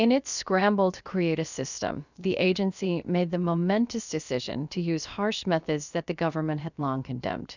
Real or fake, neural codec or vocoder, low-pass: fake; codec, 16 kHz, about 1 kbps, DyCAST, with the encoder's durations; 7.2 kHz